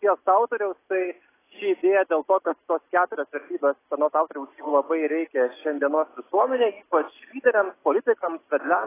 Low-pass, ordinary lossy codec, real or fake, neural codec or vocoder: 3.6 kHz; AAC, 16 kbps; real; none